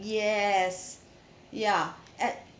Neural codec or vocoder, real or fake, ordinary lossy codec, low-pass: none; real; none; none